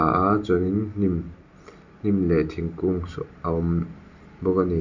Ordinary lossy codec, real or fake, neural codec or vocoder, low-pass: none; real; none; 7.2 kHz